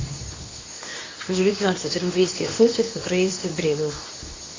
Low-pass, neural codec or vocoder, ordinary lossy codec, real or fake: 7.2 kHz; codec, 24 kHz, 0.9 kbps, WavTokenizer, medium speech release version 2; AAC, 32 kbps; fake